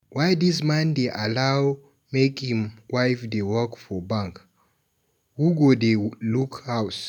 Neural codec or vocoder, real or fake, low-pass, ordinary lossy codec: none; real; 19.8 kHz; none